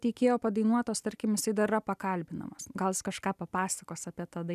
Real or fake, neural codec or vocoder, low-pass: real; none; 14.4 kHz